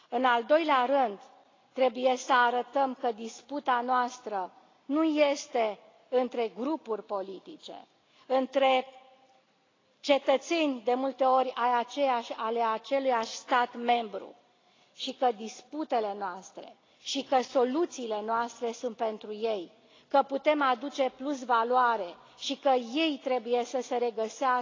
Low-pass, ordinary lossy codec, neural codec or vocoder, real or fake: 7.2 kHz; AAC, 32 kbps; none; real